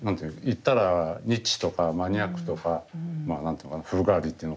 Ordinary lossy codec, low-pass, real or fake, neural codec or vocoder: none; none; real; none